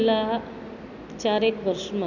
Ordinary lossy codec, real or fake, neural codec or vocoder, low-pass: none; real; none; 7.2 kHz